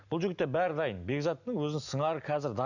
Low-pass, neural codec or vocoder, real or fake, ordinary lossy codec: 7.2 kHz; none; real; none